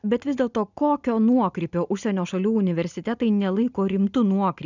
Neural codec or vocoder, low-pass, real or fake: none; 7.2 kHz; real